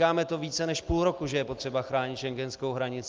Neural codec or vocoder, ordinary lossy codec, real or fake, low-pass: none; Opus, 32 kbps; real; 7.2 kHz